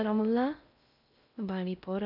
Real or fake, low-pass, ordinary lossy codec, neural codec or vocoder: fake; 5.4 kHz; none; codec, 16 kHz in and 24 kHz out, 0.6 kbps, FocalCodec, streaming, 4096 codes